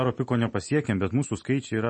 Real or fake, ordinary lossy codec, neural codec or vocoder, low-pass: real; MP3, 32 kbps; none; 9.9 kHz